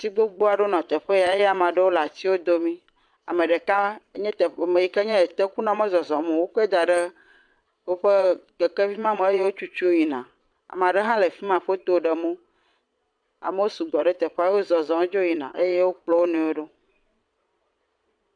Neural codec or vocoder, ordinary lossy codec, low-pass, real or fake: vocoder, 22.05 kHz, 80 mel bands, Vocos; MP3, 96 kbps; 9.9 kHz; fake